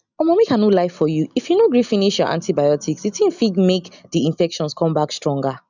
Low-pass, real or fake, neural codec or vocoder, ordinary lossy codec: 7.2 kHz; real; none; none